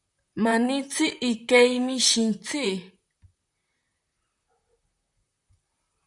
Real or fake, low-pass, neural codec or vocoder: fake; 10.8 kHz; vocoder, 44.1 kHz, 128 mel bands, Pupu-Vocoder